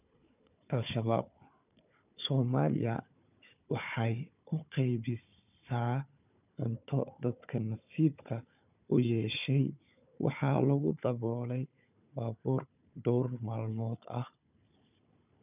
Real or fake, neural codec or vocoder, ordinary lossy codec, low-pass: fake; codec, 16 kHz, 4 kbps, FunCodec, trained on Chinese and English, 50 frames a second; none; 3.6 kHz